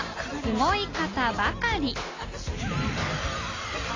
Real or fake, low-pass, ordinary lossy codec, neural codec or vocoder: real; 7.2 kHz; AAC, 32 kbps; none